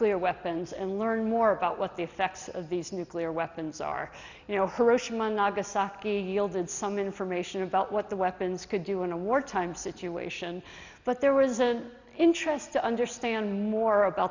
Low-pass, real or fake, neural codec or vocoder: 7.2 kHz; real; none